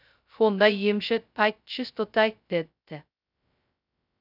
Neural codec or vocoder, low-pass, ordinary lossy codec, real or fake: codec, 16 kHz, 0.2 kbps, FocalCodec; 5.4 kHz; none; fake